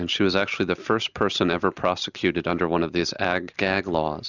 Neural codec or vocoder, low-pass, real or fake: none; 7.2 kHz; real